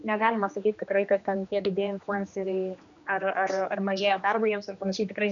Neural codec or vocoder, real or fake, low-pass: codec, 16 kHz, 2 kbps, X-Codec, HuBERT features, trained on general audio; fake; 7.2 kHz